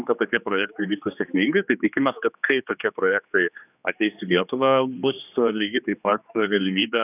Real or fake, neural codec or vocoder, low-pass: fake; codec, 16 kHz, 2 kbps, X-Codec, HuBERT features, trained on general audio; 3.6 kHz